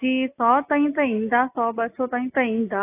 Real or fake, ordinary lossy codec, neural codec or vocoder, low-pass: real; AAC, 24 kbps; none; 3.6 kHz